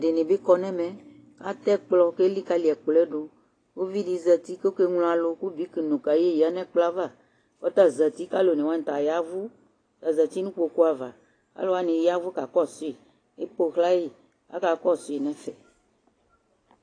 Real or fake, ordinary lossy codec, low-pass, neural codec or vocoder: real; AAC, 32 kbps; 9.9 kHz; none